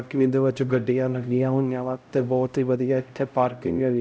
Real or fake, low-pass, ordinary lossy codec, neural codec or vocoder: fake; none; none; codec, 16 kHz, 0.5 kbps, X-Codec, HuBERT features, trained on LibriSpeech